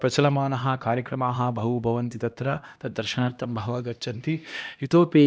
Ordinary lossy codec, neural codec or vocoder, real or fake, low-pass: none; codec, 16 kHz, 1 kbps, X-Codec, HuBERT features, trained on LibriSpeech; fake; none